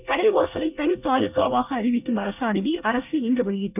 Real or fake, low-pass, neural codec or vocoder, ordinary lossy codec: fake; 3.6 kHz; codec, 24 kHz, 1 kbps, SNAC; none